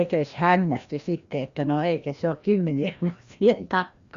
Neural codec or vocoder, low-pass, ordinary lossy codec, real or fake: codec, 16 kHz, 1 kbps, FreqCodec, larger model; 7.2 kHz; Opus, 64 kbps; fake